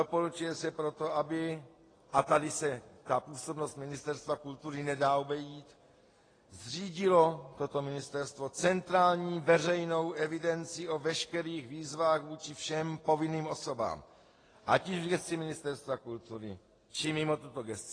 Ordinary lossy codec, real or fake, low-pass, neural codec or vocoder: AAC, 32 kbps; real; 9.9 kHz; none